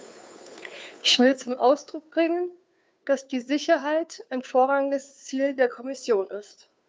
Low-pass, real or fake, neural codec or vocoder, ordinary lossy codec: none; fake; codec, 16 kHz, 2 kbps, FunCodec, trained on Chinese and English, 25 frames a second; none